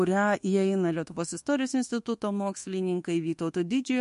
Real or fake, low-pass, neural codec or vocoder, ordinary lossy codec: fake; 10.8 kHz; codec, 24 kHz, 1.2 kbps, DualCodec; MP3, 48 kbps